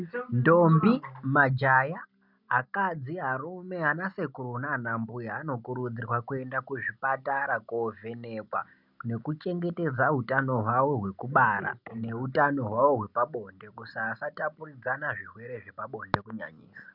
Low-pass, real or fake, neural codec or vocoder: 5.4 kHz; real; none